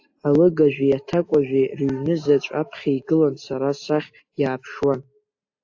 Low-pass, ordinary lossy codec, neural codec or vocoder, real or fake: 7.2 kHz; AAC, 48 kbps; none; real